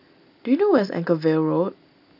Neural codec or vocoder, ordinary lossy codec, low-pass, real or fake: none; none; 5.4 kHz; real